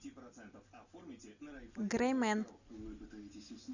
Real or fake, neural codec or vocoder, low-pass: real; none; 7.2 kHz